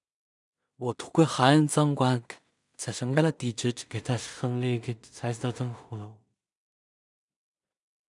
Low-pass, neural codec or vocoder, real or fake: 10.8 kHz; codec, 16 kHz in and 24 kHz out, 0.4 kbps, LongCat-Audio-Codec, two codebook decoder; fake